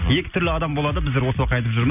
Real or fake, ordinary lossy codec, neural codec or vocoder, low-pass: real; none; none; 3.6 kHz